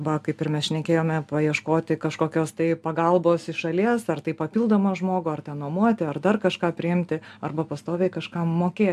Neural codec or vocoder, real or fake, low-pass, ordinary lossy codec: none; real; 14.4 kHz; AAC, 96 kbps